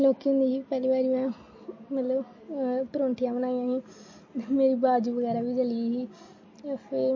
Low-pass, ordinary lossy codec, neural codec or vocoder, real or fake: 7.2 kHz; MP3, 32 kbps; none; real